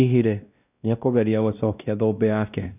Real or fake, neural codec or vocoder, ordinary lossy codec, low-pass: fake; codec, 16 kHz, 1 kbps, X-Codec, WavLM features, trained on Multilingual LibriSpeech; none; 3.6 kHz